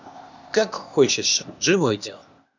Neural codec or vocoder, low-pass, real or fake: codec, 16 kHz, 0.8 kbps, ZipCodec; 7.2 kHz; fake